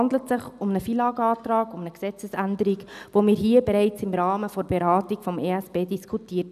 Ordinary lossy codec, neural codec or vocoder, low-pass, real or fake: none; none; 14.4 kHz; real